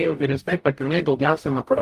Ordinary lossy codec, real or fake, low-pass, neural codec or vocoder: Opus, 32 kbps; fake; 14.4 kHz; codec, 44.1 kHz, 0.9 kbps, DAC